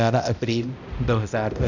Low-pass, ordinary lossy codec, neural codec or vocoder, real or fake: 7.2 kHz; none; codec, 16 kHz, 0.5 kbps, X-Codec, HuBERT features, trained on balanced general audio; fake